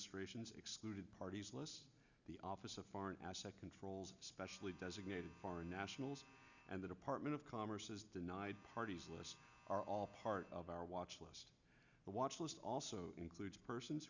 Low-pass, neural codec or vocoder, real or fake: 7.2 kHz; none; real